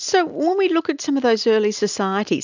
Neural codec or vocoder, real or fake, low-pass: none; real; 7.2 kHz